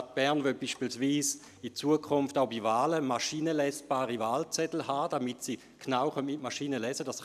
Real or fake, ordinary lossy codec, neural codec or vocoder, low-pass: real; none; none; 14.4 kHz